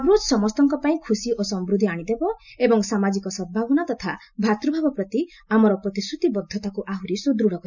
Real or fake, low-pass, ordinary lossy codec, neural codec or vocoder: real; 7.2 kHz; none; none